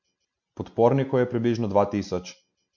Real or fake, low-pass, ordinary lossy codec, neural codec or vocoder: real; 7.2 kHz; MP3, 48 kbps; none